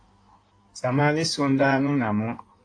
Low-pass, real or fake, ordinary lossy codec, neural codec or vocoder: 9.9 kHz; fake; AAC, 48 kbps; codec, 16 kHz in and 24 kHz out, 1.1 kbps, FireRedTTS-2 codec